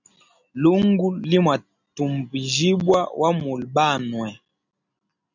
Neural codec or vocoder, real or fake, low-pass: none; real; 7.2 kHz